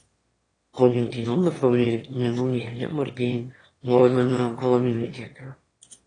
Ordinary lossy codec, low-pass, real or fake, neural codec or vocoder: AAC, 32 kbps; 9.9 kHz; fake; autoencoder, 22.05 kHz, a latent of 192 numbers a frame, VITS, trained on one speaker